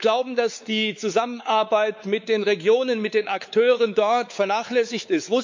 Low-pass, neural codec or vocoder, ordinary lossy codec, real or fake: 7.2 kHz; codec, 16 kHz, 4 kbps, X-Codec, WavLM features, trained on Multilingual LibriSpeech; MP3, 64 kbps; fake